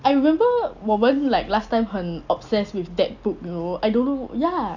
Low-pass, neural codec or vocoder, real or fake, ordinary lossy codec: 7.2 kHz; none; real; none